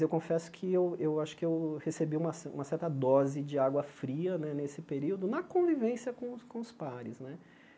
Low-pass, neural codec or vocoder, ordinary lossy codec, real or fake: none; none; none; real